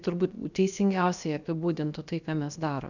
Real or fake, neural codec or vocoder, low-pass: fake; codec, 16 kHz, about 1 kbps, DyCAST, with the encoder's durations; 7.2 kHz